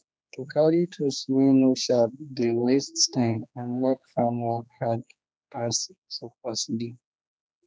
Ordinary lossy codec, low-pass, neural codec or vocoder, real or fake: none; none; codec, 16 kHz, 2 kbps, X-Codec, HuBERT features, trained on general audio; fake